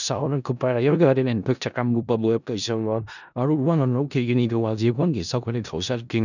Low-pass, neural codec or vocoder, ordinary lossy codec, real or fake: 7.2 kHz; codec, 16 kHz in and 24 kHz out, 0.4 kbps, LongCat-Audio-Codec, four codebook decoder; none; fake